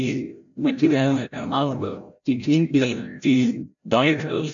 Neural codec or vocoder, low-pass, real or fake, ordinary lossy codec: codec, 16 kHz, 0.5 kbps, FreqCodec, larger model; 7.2 kHz; fake; MP3, 96 kbps